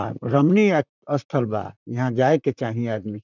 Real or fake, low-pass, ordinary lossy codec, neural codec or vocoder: fake; 7.2 kHz; none; vocoder, 44.1 kHz, 128 mel bands, Pupu-Vocoder